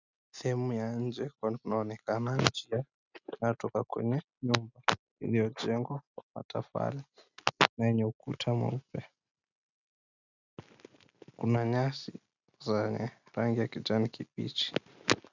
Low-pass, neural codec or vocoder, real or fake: 7.2 kHz; none; real